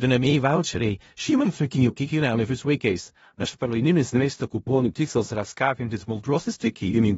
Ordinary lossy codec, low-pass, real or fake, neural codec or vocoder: AAC, 24 kbps; 10.8 kHz; fake; codec, 16 kHz in and 24 kHz out, 0.4 kbps, LongCat-Audio-Codec, four codebook decoder